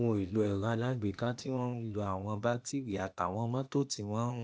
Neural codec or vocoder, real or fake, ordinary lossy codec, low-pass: codec, 16 kHz, 0.8 kbps, ZipCodec; fake; none; none